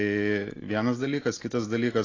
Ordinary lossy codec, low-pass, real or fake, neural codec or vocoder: AAC, 32 kbps; 7.2 kHz; real; none